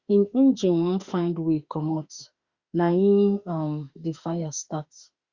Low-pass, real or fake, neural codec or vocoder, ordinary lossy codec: 7.2 kHz; fake; autoencoder, 48 kHz, 32 numbers a frame, DAC-VAE, trained on Japanese speech; Opus, 64 kbps